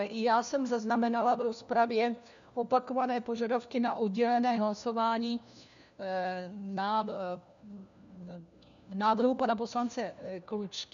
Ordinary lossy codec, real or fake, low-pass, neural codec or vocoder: MP3, 96 kbps; fake; 7.2 kHz; codec, 16 kHz, 1 kbps, FunCodec, trained on LibriTTS, 50 frames a second